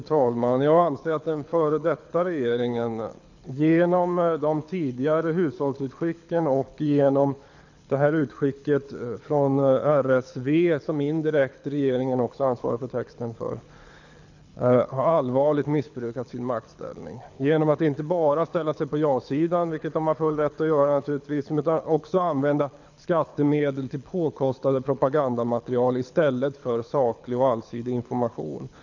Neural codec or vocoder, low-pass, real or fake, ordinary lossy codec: codec, 24 kHz, 6 kbps, HILCodec; 7.2 kHz; fake; none